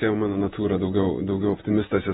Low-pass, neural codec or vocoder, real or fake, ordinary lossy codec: 19.8 kHz; vocoder, 48 kHz, 128 mel bands, Vocos; fake; AAC, 16 kbps